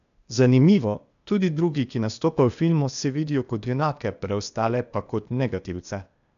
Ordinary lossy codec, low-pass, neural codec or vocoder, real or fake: none; 7.2 kHz; codec, 16 kHz, 0.8 kbps, ZipCodec; fake